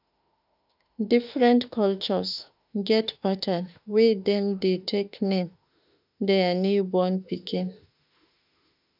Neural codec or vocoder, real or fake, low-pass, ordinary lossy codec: autoencoder, 48 kHz, 32 numbers a frame, DAC-VAE, trained on Japanese speech; fake; 5.4 kHz; none